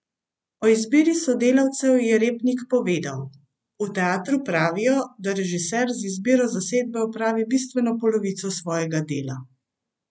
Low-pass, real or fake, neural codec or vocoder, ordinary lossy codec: none; real; none; none